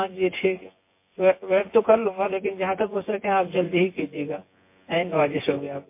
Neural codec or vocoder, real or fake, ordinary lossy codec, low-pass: vocoder, 24 kHz, 100 mel bands, Vocos; fake; MP3, 24 kbps; 3.6 kHz